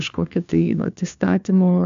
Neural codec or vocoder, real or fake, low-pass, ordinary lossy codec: codec, 16 kHz, 1 kbps, FunCodec, trained on LibriTTS, 50 frames a second; fake; 7.2 kHz; MP3, 64 kbps